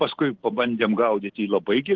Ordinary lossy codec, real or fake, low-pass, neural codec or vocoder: Opus, 16 kbps; real; 7.2 kHz; none